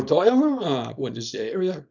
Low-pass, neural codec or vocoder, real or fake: 7.2 kHz; codec, 24 kHz, 0.9 kbps, WavTokenizer, small release; fake